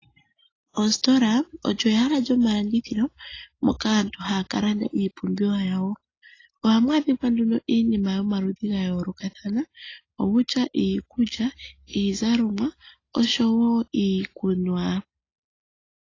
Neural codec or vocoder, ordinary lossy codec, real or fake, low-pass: none; AAC, 32 kbps; real; 7.2 kHz